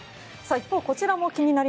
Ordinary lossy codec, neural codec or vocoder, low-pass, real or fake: none; none; none; real